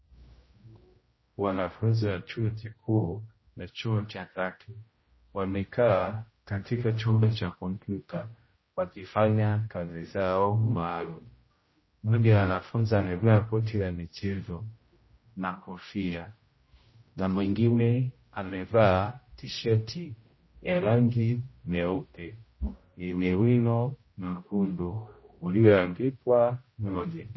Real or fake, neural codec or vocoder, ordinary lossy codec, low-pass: fake; codec, 16 kHz, 0.5 kbps, X-Codec, HuBERT features, trained on general audio; MP3, 24 kbps; 7.2 kHz